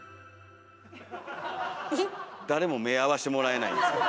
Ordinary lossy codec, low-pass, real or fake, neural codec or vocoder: none; none; real; none